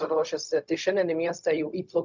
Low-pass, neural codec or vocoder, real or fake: 7.2 kHz; codec, 16 kHz, 0.4 kbps, LongCat-Audio-Codec; fake